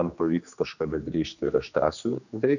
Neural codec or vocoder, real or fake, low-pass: codec, 16 kHz, 2 kbps, X-Codec, HuBERT features, trained on general audio; fake; 7.2 kHz